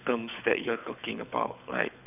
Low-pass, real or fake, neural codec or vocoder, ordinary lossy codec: 3.6 kHz; fake; codec, 24 kHz, 6 kbps, HILCodec; none